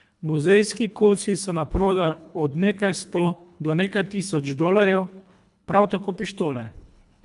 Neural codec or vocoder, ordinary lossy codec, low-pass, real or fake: codec, 24 kHz, 1.5 kbps, HILCodec; none; 10.8 kHz; fake